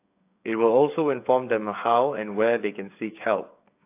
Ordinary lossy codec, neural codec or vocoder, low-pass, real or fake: AAC, 32 kbps; codec, 16 kHz, 8 kbps, FreqCodec, smaller model; 3.6 kHz; fake